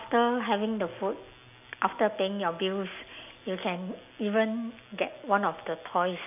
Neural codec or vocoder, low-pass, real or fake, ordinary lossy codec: none; 3.6 kHz; real; none